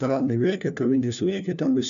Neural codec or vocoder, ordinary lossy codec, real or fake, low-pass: codec, 16 kHz, 1 kbps, FunCodec, trained on LibriTTS, 50 frames a second; MP3, 96 kbps; fake; 7.2 kHz